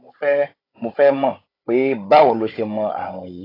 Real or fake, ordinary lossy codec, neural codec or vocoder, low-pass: fake; AAC, 24 kbps; codec, 16 kHz, 16 kbps, FunCodec, trained on Chinese and English, 50 frames a second; 5.4 kHz